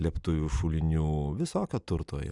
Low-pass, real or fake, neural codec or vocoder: 10.8 kHz; real; none